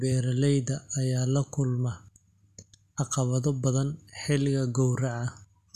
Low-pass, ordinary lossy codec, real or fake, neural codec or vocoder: 14.4 kHz; none; real; none